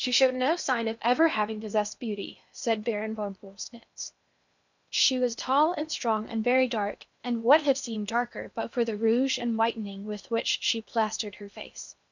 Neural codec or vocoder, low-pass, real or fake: codec, 16 kHz in and 24 kHz out, 0.8 kbps, FocalCodec, streaming, 65536 codes; 7.2 kHz; fake